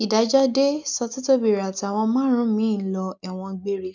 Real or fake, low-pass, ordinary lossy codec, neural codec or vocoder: real; 7.2 kHz; none; none